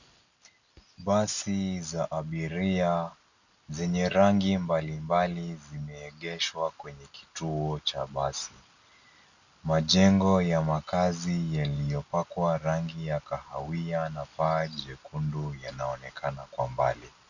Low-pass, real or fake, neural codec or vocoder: 7.2 kHz; real; none